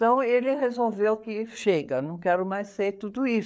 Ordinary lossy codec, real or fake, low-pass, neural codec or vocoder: none; fake; none; codec, 16 kHz, 4 kbps, FreqCodec, larger model